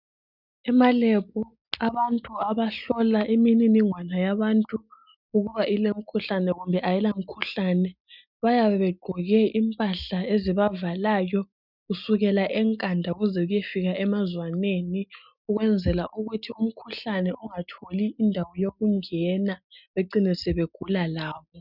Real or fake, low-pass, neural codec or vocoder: real; 5.4 kHz; none